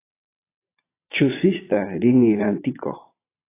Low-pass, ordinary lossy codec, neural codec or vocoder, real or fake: 3.6 kHz; AAC, 24 kbps; vocoder, 22.05 kHz, 80 mel bands, Vocos; fake